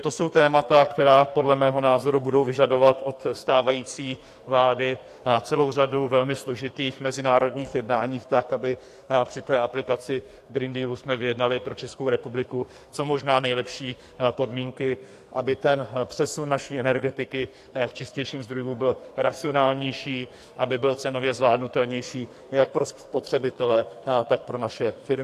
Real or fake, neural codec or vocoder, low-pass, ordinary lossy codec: fake; codec, 44.1 kHz, 2.6 kbps, SNAC; 14.4 kHz; AAC, 64 kbps